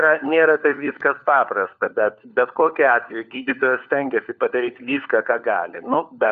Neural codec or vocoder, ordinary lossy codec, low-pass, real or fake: codec, 16 kHz, 4 kbps, FunCodec, trained on LibriTTS, 50 frames a second; Opus, 64 kbps; 7.2 kHz; fake